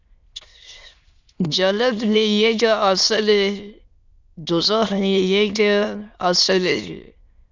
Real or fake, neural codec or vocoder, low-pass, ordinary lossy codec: fake; autoencoder, 22.05 kHz, a latent of 192 numbers a frame, VITS, trained on many speakers; 7.2 kHz; Opus, 64 kbps